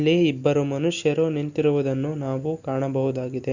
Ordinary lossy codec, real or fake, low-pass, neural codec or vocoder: Opus, 64 kbps; real; 7.2 kHz; none